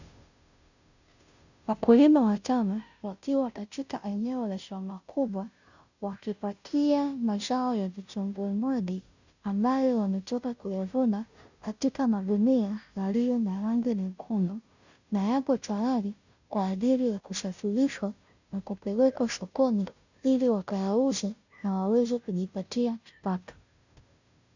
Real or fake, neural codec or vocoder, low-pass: fake; codec, 16 kHz, 0.5 kbps, FunCodec, trained on Chinese and English, 25 frames a second; 7.2 kHz